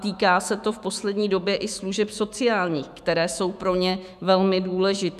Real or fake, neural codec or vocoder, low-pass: fake; autoencoder, 48 kHz, 128 numbers a frame, DAC-VAE, trained on Japanese speech; 14.4 kHz